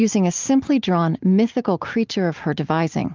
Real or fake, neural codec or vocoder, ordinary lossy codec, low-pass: real; none; Opus, 32 kbps; 7.2 kHz